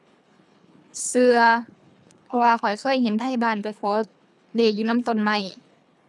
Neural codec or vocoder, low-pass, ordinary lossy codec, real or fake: codec, 24 kHz, 3 kbps, HILCodec; none; none; fake